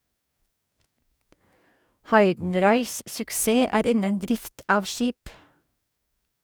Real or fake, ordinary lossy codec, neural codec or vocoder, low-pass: fake; none; codec, 44.1 kHz, 2.6 kbps, DAC; none